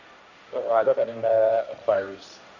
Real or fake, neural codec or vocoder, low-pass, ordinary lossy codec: fake; codec, 16 kHz, 1.1 kbps, Voila-Tokenizer; 7.2 kHz; none